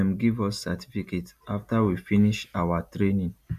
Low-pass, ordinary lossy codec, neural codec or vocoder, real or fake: 14.4 kHz; none; none; real